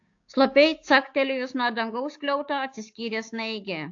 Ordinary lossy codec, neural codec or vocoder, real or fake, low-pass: AAC, 48 kbps; codec, 16 kHz, 6 kbps, DAC; fake; 7.2 kHz